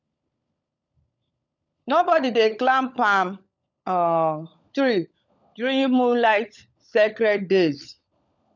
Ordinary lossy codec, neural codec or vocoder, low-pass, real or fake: none; codec, 16 kHz, 16 kbps, FunCodec, trained on LibriTTS, 50 frames a second; 7.2 kHz; fake